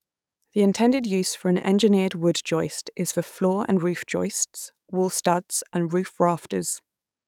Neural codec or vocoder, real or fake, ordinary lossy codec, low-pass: codec, 44.1 kHz, 7.8 kbps, DAC; fake; none; 19.8 kHz